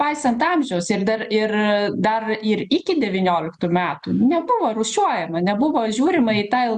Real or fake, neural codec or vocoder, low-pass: real; none; 10.8 kHz